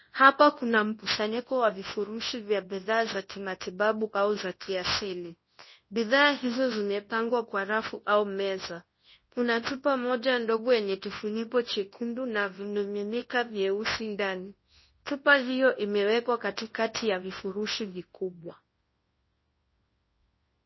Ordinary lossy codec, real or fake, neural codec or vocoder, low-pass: MP3, 24 kbps; fake; codec, 24 kHz, 0.9 kbps, WavTokenizer, large speech release; 7.2 kHz